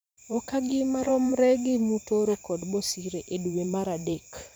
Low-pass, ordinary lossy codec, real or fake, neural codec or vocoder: none; none; fake; vocoder, 44.1 kHz, 128 mel bands every 512 samples, BigVGAN v2